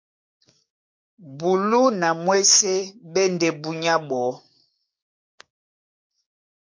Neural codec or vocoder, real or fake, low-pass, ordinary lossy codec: codec, 16 kHz, 6 kbps, DAC; fake; 7.2 kHz; MP3, 48 kbps